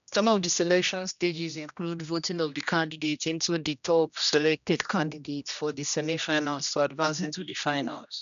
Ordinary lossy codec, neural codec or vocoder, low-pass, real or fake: MP3, 96 kbps; codec, 16 kHz, 1 kbps, X-Codec, HuBERT features, trained on general audio; 7.2 kHz; fake